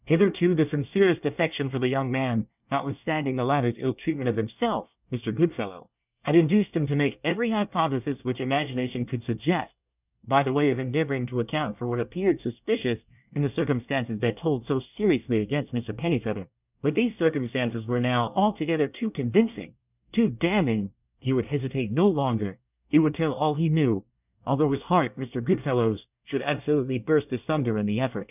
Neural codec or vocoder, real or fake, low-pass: codec, 24 kHz, 1 kbps, SNAC; fake; 3.6 kHz